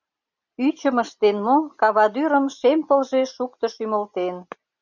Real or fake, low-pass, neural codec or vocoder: real; 7.2 kHz; none